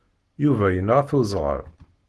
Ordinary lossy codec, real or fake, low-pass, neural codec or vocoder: Opus, 16 kbps; real; 10.8 kHz; none